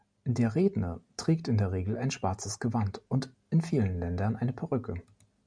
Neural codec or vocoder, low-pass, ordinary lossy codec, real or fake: none; 9.9 kHz; MP3, 96 kbps; real